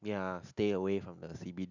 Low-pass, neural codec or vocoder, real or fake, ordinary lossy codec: 7.2 kHz; none; real; none